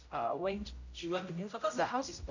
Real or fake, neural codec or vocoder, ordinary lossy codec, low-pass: fake; codec, 16 kHz, 0.5 kbps, X-Codec, HuBERT features, trained on general audio; none; 7.2 kHz